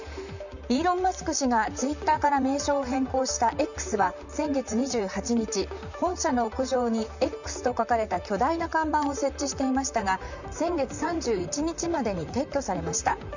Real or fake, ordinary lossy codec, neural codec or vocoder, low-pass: fake; none; vocoder, 44.1 kHz, 128 mel bands, Pupu-Vocoder; 7.2 kHz